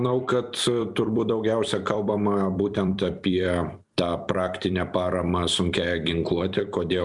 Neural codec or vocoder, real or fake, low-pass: vocoder, 44.1 kHz, 128 mel bands every 256 samples, BigVGAN v2; fake; 10.8 kHz